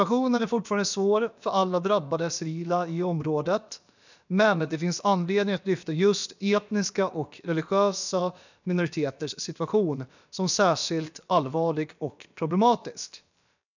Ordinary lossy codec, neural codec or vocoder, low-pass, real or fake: none; codec, 16 kHz, about 1 kbps, DyCAST, with the encoder's durations; 7.2 kHz; fake